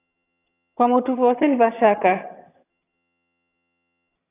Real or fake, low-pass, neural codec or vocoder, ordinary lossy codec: fake; 3.6 kHz; vocoder, 22.05 kHz, 80 mel bands, HiFi-GAN; AAC, 24 kbps